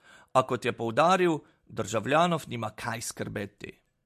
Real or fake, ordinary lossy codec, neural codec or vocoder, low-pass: real; MP3, 64 kbps; none; 14.4 kHz